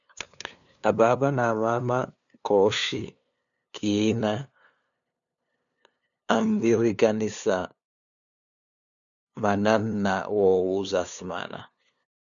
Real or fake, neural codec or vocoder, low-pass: fake; codec, 16 kHz, 2 kbps, FunCodec, trained on LibriTTS, 25 frames a second; 7.2 kHz